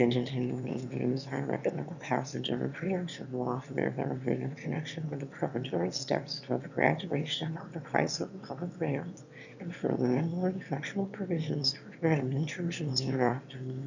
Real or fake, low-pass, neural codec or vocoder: fake; 7.2 kHz; autoencoder, 22.05 kHz, a latent of 192 numbers a frame, VITS, trained on one speaker